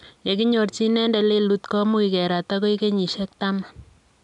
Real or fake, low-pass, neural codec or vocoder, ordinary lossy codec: real; 10.8 kHz; none; none